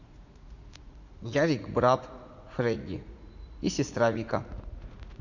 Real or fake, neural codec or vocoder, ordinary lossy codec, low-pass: fake; vocoder, 44.1 kHz, 80 mel bands, Vocos; none; 7.2 kHz